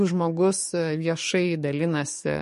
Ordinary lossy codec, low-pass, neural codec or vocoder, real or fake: MP3, 48 kbps; 14.4 kHz; codec, 44.1 kHz, 7.8 kbps, DAC; fake